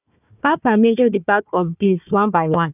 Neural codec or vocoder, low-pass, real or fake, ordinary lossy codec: codec, 16 kHz, 1 kbps, FunCodec, trained on Chinese and English, 50 frames a second; 3.6 kHz; fake; none